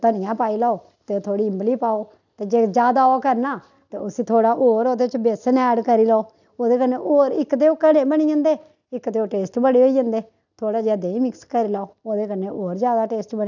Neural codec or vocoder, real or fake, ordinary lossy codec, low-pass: none; real; none; 7.2 kHz